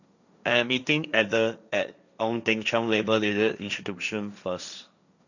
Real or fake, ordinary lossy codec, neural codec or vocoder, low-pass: fake; none; codec, 16 kHz, 1.1 kbps, Voila-Tokenizer; 7.2 kHz